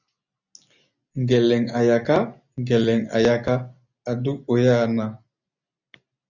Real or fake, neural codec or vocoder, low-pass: real; none; 7.2 kHz